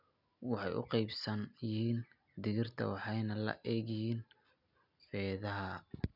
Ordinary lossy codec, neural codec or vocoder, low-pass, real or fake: none; none; 5.4 kHz; real